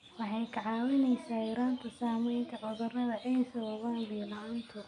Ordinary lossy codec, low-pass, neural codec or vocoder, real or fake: none; 10.8 kHz; none; real